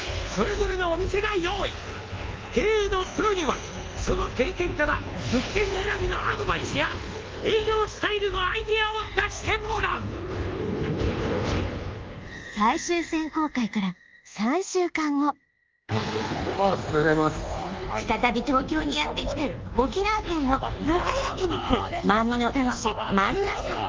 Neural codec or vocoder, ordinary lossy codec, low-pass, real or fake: codec, 24 kHz, 1.2 kbps, DualCodec; Opus, 32 kbps; 7.2 kHz; fake